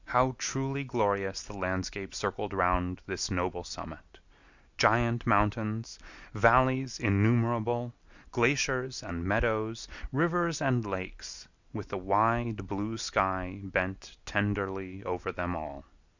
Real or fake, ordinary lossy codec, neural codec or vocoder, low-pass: real; Opus, 64 kbps; none; 7.2 kHz